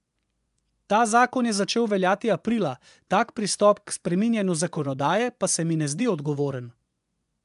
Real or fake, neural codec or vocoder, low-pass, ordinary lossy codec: fake; vocoder, 24 kHz, 100 mel bands, Vocos; 10.8 kHz; MP3, 96 kbps